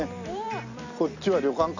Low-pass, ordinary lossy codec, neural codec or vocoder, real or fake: 7.2 kHz; none; none; real